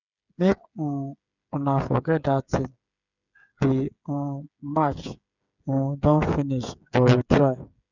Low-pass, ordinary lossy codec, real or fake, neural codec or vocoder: 7.2 kHz; none; fake; codec, 16 kHz, 8 kbps, FreqCodec, smaller model